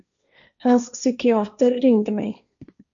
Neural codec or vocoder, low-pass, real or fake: codec, 16 kHz, 1.1 kbps, Voila-Tokenizer; 7.2 kHz; fake